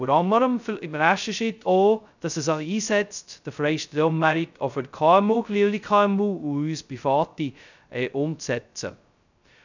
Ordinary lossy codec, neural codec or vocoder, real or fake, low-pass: none; codec, 16 kHz, 0.2 kbps, FocalCodec; fake; 7.2 kHz